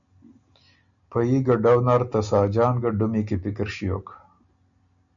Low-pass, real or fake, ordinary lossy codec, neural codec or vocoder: 7.2 kHz; real; MP3, 96 kbps; none